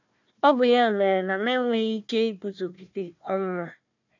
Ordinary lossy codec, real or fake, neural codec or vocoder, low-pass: none; fake; codec, 16 kHz, 1 kbps, FunCodec, trained on Chinese and English, 50 frames a second; 7.2 kHz